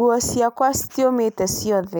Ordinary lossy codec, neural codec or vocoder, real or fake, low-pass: none; none; real; none